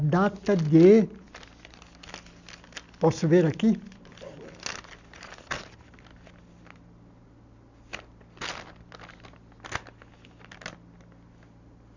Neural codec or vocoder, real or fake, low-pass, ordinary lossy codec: none; real; 7.2 kHz; none